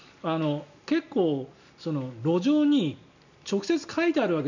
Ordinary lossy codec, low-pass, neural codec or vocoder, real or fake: none; 7.2 kHz; none; real